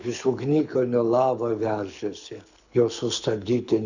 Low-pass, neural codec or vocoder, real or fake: 7.2 kHz; codec, 24 kHz, 6 kbps, HILCodec; fake